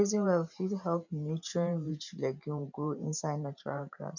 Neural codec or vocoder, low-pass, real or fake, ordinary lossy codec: vocoder, 44.1 kHz, 128 mel bands every 512 samples, BigVGAN v2; 7.2 kHz; fake; none